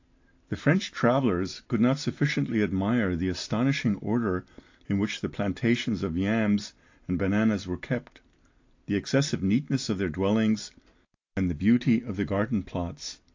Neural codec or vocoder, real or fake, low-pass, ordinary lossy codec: none; real; 7.2 kHz; AAC, 48 kbps